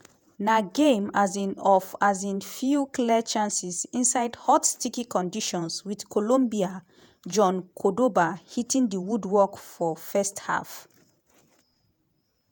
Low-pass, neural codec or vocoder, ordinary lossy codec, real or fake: none; vocoder, 48 kHz, 128 mel bands, Vocos; none; fake